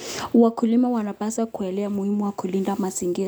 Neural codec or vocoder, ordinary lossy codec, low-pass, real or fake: none; none; none; real